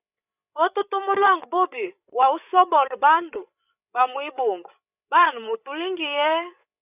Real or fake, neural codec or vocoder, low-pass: fake; codec, 16 kHz, 8 kbps, FreqCodec, larger model; 3.6 kHz